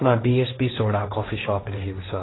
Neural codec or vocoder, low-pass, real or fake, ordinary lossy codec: codec, 16 kHz, 1.1 kbps, Voila-Tokenizer; 7.2 kHz; fake; AAC, 16 kbps